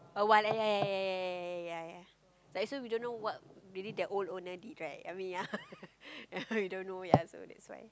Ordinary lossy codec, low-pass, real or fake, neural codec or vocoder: none; none; real; none